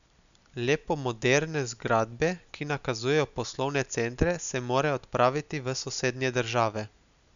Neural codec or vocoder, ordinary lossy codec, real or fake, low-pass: none; none; real; 7.2 kHz